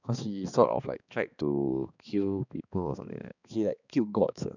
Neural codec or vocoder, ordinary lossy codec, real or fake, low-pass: codec, 16 kHz, 2 kbps, X-Codec, HuBERT features, trained on balanced general audio; none; fake; 7.2 kHz